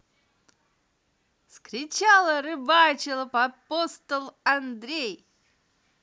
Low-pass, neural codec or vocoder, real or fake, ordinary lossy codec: none; none; real; none